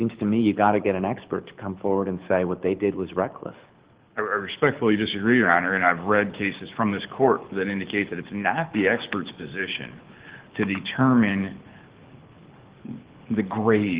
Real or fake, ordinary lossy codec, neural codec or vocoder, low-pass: fake; Opus, 16 kbps; codec, 24 kHz, 6 kbps, HILCodec; 3.6 kHz